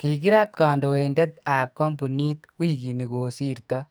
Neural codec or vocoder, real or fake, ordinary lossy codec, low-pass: codec, 44.1 kHz, 2.6 kbps, SNAC; fake; none; none